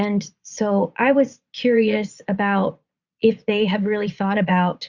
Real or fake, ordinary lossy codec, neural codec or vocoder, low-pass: fake; Opus, 64 kbps; vocoder, 22.05 kHz, 80 mel bands, Vocos; 7.2 kHz